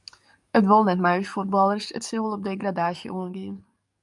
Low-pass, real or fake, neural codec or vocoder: 10.8 kHz; fake; codec, 44.1 kHz, 7.8 kbps, DAC